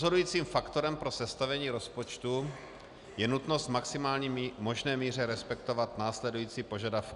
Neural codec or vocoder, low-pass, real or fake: none; 10.8 kHz; real